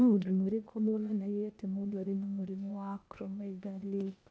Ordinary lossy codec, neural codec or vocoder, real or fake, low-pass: none; codec, 16 kHz, 0.8 kbps, ZipCodec; fake; none